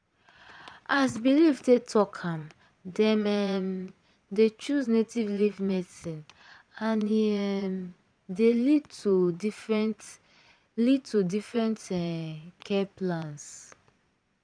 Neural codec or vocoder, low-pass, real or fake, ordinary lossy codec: vocoder, 22.05 kHz, 80 mel bands, Vocos; 9.9 kHz; fake; none